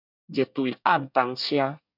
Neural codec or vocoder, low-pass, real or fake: codec, 24 kHz, 1 kbps, SNAC; 5.4 kHz; fake